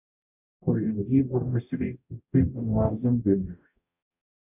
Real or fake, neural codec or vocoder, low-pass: fake; codec, 44.1 kHz, 0.9 kbps, DAC; 3.6 kHz